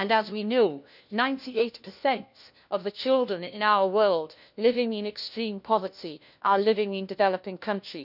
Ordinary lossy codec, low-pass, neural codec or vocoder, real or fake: none; 5.4 kHz; codec, 16 kHz, 1 kbps, FunCodec, trained on LibriTTS, 50 frames a second; fake